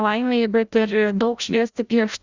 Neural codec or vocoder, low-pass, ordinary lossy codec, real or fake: codec, 16 kHz, 0.5 kbps, FreqCodec, larger model; 7.2 kHz; Opus, 64 kbps; fake